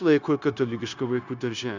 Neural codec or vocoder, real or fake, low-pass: codec, 16 kHz, 0.9 kbps, LongCat-Audio-Codec; fake; 7.2 kHz